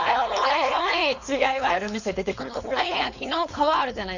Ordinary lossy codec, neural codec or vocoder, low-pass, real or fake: Opus, 64 kbps; codec, 16 kHz, 4.8 kbps, FACodec; 7.2 kHz; fake